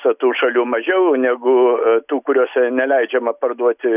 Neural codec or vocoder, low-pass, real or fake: none; 3.6 kHz; real